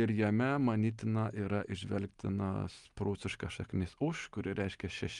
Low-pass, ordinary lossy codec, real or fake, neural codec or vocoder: 10.8 kHz; Opus, 32 kbps; real; none